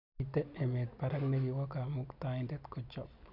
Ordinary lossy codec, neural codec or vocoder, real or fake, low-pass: none; none; real; 5.4 kHz